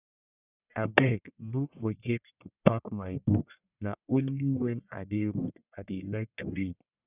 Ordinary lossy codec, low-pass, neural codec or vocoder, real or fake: none; 3.6 kHz; codec, 44.1 kHz, 1.7 kbps, Pupu-Codec; fake